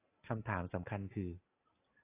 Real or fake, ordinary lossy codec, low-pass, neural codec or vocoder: real; AAC, 32 kbps; 3.6 kHz; none